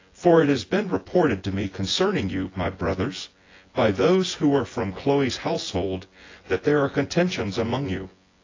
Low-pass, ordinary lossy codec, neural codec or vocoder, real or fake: 7.2 kHz; AAC, 32 kbps; vocoder, 24 kHz, 100 mel bands, Vocos; fake